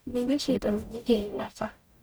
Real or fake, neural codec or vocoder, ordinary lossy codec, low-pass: fake; codec, 44.1 kHz, 0.9 kbps, DAC; none; none